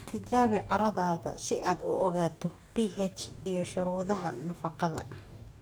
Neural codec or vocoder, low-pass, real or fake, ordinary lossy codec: codec, 44.1 kHz, 2.6 kbps, DAC; none; fake; none